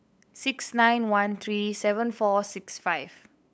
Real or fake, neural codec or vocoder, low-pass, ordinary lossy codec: fake; codec, 16 kHz, 8 kbps, FunCodec, trained on LibriTTS, 25 frames a second; none; none